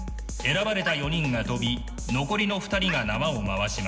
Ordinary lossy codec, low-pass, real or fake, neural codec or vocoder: none; none; real; none